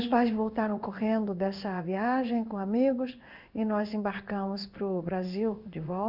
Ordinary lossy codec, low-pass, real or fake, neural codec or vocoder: none; 5.4 kHz; fake; codec, 16 kHz in and 24 kHz out, 1 kbps, XY-Tokenizer